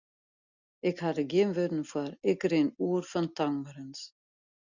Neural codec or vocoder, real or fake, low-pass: none; real; 7.2 kHz